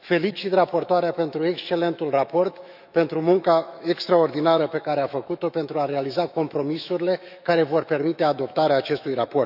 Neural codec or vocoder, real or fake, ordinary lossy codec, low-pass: autoencoder, 48 kHz, 128 numbers a frame, DAC-VAE, trained on Japanese speech; fake; none; 5.4 kHz